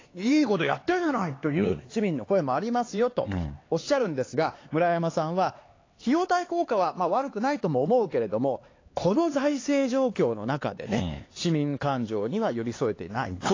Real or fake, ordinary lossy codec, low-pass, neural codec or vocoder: fake; AAC, 32 kbps; 7.2 kHz; codec, 16 kHz, 4 kbps, X-Codec, HuBERT features, trained on LibriSpeech